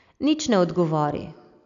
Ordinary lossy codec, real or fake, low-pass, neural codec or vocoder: none; real; 7.2 kHz; none